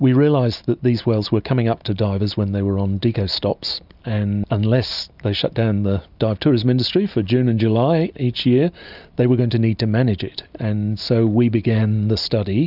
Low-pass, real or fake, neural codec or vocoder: 5.4 kHz; real; none